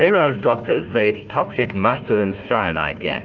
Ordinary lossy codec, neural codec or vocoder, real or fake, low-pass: Opus, 24 kbps; codec, 16 kHz, 1 kbps, FunCodec, trained on Chinese and English, 50 frames a second; fake; 7.2 kHz